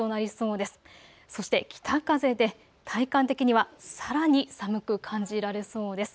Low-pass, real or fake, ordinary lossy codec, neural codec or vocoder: none; real; none; none